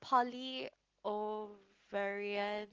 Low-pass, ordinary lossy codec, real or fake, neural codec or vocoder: 7.2 kHz; Opus, 16 kbps; real; none